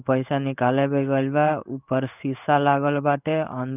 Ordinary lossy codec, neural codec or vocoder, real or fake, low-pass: none; codec, 16 kHz in and 24 kHz out, 1 kbps, XY-Tokenizer; fake; 3.6 kHz